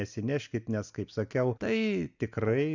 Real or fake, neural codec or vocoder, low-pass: real; none; 7.2 kHz